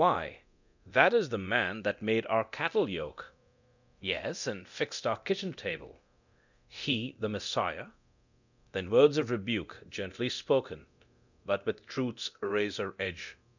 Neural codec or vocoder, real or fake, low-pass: codec, 24 kHz, 0.9 kbps, DualCodec; fake; 7.2 kHz